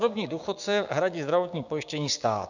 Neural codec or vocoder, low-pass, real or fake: codec, 16 kHz, 6 kbps, DAC; 7.2 kHz; fake